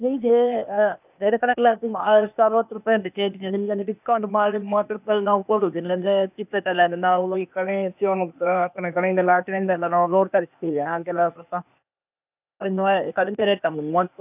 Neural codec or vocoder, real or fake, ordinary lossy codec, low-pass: codec, 16 kHz, 0.8 kbps, ZipCodec; fake; none; 3.6 kHz